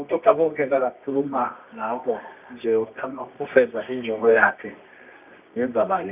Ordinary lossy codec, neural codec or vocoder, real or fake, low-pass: none; codec, 24 kHz, 0.9 kbps, WavTokenizer, medium music audio release; fake; 3.6 kHz